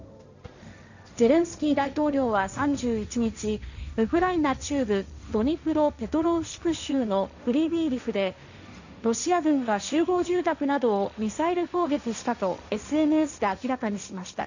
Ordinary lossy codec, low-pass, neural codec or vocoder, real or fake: none; 7.2 kHz; codec, 16 kHz, 1.1 kbps, Voila-Tokenizer; fake